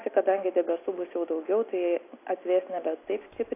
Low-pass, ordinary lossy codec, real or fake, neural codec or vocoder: 3.6 kHz; AAC, 24 kbps; real; none